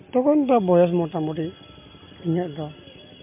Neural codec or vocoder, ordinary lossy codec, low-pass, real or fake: none; MP3, 32 kbps; 3.6 kHz; real